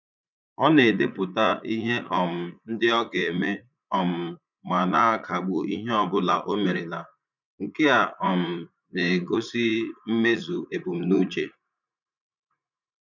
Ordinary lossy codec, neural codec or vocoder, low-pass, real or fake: none; vocoder, 44.1 kHz, 80 mel bands, Vocos; 7.2 kHz; fake